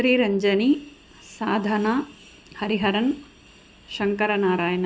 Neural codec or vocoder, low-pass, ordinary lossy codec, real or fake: none; none; none; real